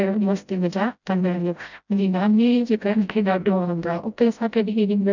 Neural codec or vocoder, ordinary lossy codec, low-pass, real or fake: codec, 16 kHz, 0.5 kbps, FreqCodec, smaller model; none; 7.2 kHz; fake